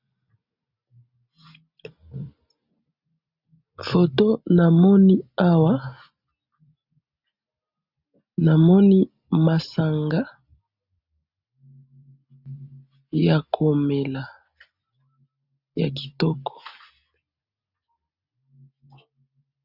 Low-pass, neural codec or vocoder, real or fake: 5.4 kHz; none; real